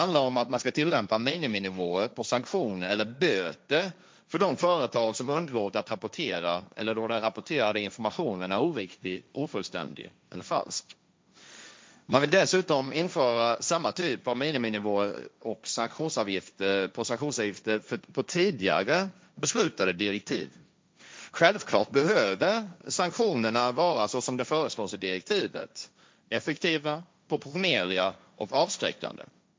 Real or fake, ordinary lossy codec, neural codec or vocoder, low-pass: fake; none; codec, 16 kHz, 1.1 kbps, Voila-Tokenizer; none